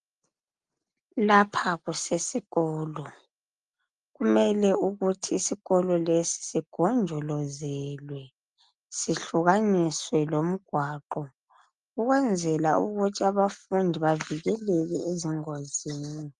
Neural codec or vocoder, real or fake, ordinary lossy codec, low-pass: none; real; Opus, 32 kbps; 10.8 kHz